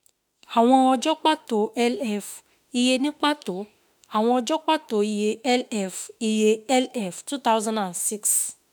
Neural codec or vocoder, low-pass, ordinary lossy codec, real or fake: autoencoder, 48 kHz, 32 numbers a frame, DAC-VAE, trained on Japanese speech; none; none; fake